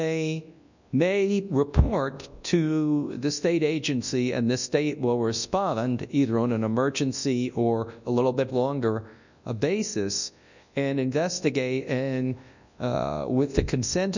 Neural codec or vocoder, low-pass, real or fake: codec, 24 kHz, 0.9 kbps, WavTokenizer, large speech release; 7.2 kHz; fake